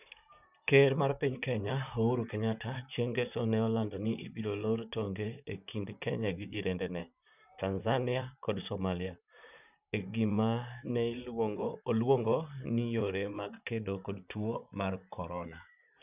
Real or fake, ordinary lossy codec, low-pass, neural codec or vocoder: fake; none; 3.6 kHz; vocoder, 44.1 kHz, 128 mel bands, Pupu-Vocoder